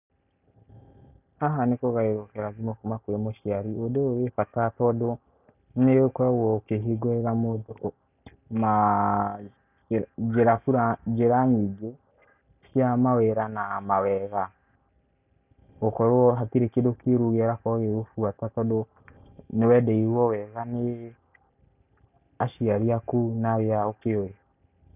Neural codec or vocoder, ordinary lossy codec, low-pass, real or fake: none; none; 3.6 kHz; real